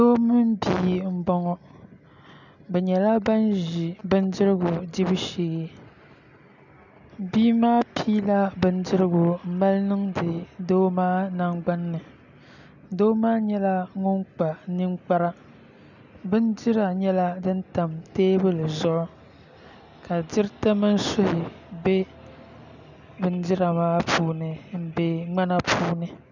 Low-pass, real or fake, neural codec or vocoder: 7.2 kHz; real; none